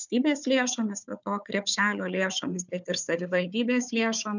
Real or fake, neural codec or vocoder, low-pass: fake; codec, 16 kHz, 4 kbps, FunCodec, trained on Chinese and English, 50 frames a second; 7.2 kHz